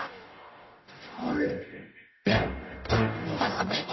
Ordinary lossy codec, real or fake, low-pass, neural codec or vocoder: MP3, 24 kbps; fake; 7.2 kHz; codec, 44.1 kHz, 0.9 kbps, DAC